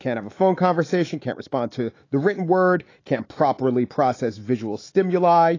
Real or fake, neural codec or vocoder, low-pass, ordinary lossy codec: fake; autoencoder, 48 kHz, 128 numbers a frame, DAC-VAE, trained on Japanese speech; 7.2 kHz; AAC, 32 kbps